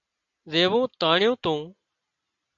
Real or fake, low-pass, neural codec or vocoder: real; 7.2 kHz; none